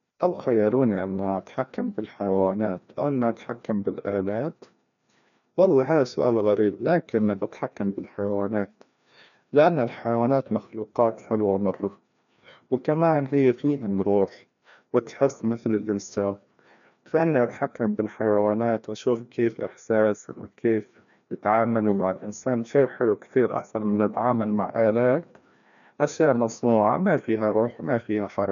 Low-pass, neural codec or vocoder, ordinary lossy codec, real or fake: 7.2 kHz; codec, 16 kHz, 1 kbps, FreqCodec, larger model; none; fake